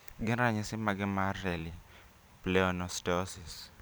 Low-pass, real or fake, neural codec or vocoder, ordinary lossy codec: none; fake; vocoder, 44.1 kHz, 128 mel bands every 256 samples, BigVGAN v2; none